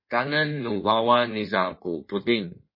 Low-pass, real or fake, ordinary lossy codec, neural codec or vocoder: 5.4 kHz; fake; MP3, 24 kbps; codec, 16 kHz in and 24 kHz out, 1.1 kbps, FireRedTTS-2 codec